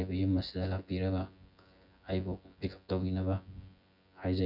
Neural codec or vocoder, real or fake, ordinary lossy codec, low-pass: vocoder, 24 kHz, 100 mel bands, Vocos; fake; none; 5.4 kHz